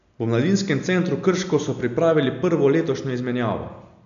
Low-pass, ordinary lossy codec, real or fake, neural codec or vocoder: 7.2 kHz; none; real; none